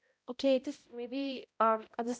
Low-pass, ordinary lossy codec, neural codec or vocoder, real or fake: none; none; codec, 16 kHz, 0.5 kbps, X-Codec, HuBERT features, trained on balanced general audio; fake